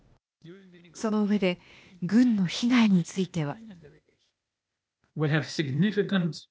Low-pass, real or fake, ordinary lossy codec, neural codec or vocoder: none; fake; none; codec, 16 kHz, 0.8 kbps, ZipCodec